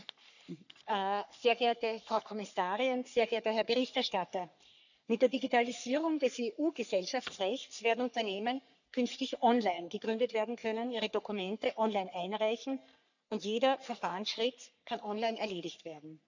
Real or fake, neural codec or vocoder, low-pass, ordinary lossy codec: fake; codec, 44.1 kHz, 3.4 kbps, Pupu-Codec; 7.2 kHz; none